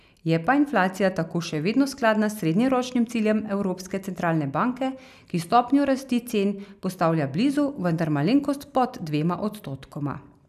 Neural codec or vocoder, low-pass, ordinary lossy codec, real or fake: none; 14.4 kHz; none; real